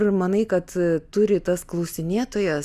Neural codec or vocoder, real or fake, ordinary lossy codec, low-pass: none; real; Opus, 64 kbps; 14.4 kHz